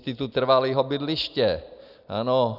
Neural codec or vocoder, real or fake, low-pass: none; real; 5.4 kHz